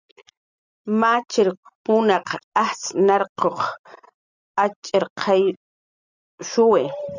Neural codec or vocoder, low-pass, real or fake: none; 7.2 kHz; real